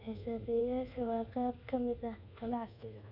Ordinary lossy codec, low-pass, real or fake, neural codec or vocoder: none; 5.4 kHz; fake; codec, 24 kHz, 1.2 kbps, DualCodec